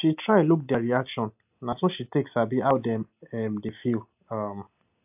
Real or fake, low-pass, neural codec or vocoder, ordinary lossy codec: real; 3.6 kHz; none; AAC, 32 kbps